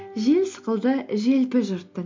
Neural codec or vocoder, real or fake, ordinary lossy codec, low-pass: none; real; MP3, 48 kbps; 7.2 kHz